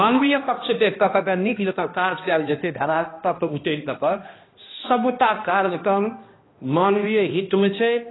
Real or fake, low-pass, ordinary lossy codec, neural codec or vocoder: fake; 7.2 kHz; AAC, 16 kbps; codec, 16 kHz, 1 kbps, X-Codec, HuBERT features, trained on balanced general audio